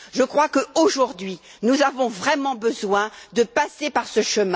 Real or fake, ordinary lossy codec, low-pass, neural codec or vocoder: real; none; none; none